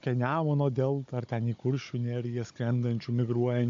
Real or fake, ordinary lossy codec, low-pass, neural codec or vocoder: fake; AAC, 48 kbps; 7.2 kHz; codec, 16 kHz, 16 kbps, FunCodec, trained on Chinese and English, 50 frames a second